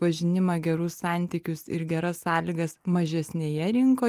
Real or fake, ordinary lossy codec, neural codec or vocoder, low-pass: real; Opus, 32 kbps; none; 14.4 kHz